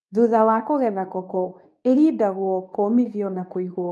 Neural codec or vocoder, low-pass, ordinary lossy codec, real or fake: codec, 24 kHz, 0.9 kbps, WavTokenizer, medium speech release version 2; none; none; fake